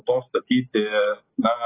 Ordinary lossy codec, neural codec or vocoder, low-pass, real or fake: AAC, 16 kbps; none; 3.6 kHz; real